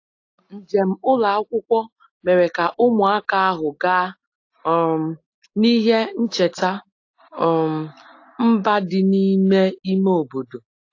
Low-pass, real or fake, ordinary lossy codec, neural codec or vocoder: 7.2 kHz; real; AAC, 48 kbps; none